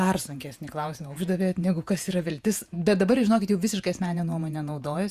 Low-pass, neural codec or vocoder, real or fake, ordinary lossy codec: 14.4 kHz; vocoder, 48 kHz, 128 mel bands, Vocos; fake; Opus, 64 kbps